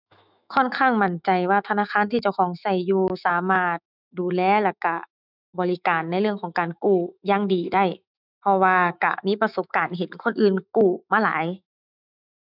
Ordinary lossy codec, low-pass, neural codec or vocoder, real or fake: none; 5.4 kHz; autoencoder, 48 kHz, 128 numbers a frame, DAC-VAE, trained on Japanese speech; fake